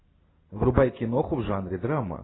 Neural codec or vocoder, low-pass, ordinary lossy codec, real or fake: none; 7.2 kHz; AAC, 16 kbps; real